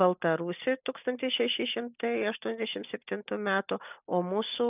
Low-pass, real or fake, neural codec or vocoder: 3.6 kHz; real; none